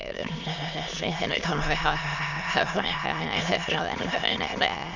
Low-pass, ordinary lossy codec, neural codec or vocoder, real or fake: 7.2 kHz; Opus, 64 kbps; autoencoder, 22.05 kHz, a latent of 192 numbers a frame, VITS, trained on many speakers; fake